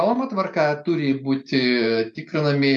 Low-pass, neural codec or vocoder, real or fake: 10.8 kHz; none; real